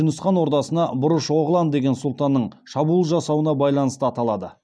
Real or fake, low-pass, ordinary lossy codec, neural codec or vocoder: real; none; none; none